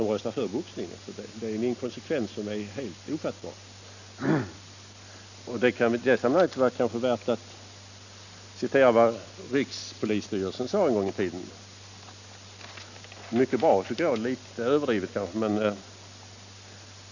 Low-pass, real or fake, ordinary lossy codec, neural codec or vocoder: 7.2 kHz; real; none; none